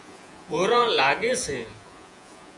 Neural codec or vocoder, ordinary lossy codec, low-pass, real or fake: vocoder, 48 kHz, 128 mel bands, Vocos; Opus, 64 kbps; 10.8 kHz; fake